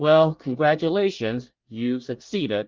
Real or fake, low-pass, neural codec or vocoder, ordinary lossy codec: fake; 7.2 kHz; codec, 24 kHz, 1 kbps, SNAC; Opus, 32 kbps